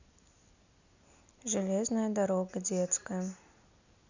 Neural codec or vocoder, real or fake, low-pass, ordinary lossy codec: none; real; 7.2 kHz; none